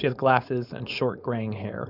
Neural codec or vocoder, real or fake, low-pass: codec, 16 kHz, 4.8 kbps, FACodec; fake; 5.4 kHz